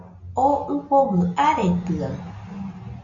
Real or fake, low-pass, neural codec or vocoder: real; 7.2 kHz; none